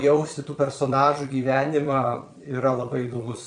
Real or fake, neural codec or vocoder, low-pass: fake; vocoder, 22.05 kHz, 80 mel bands, Vocos; 9.9 kHz